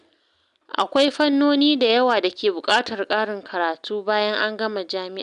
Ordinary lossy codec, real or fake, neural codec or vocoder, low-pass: MP3, 96 kbps; real; none; 10.8 kHz